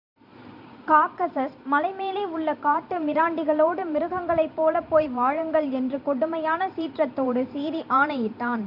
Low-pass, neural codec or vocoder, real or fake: 5.4 kHz; vocoder, 44.1 kHz, 128 mel bands every 512 samples, BigVGAN v2; fake